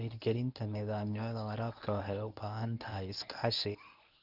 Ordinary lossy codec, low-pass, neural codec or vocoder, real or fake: none; 5.4 kHz; codec, 24 kHz, 0.9 kbps, WavTokenizer, medium speech release version 1; fake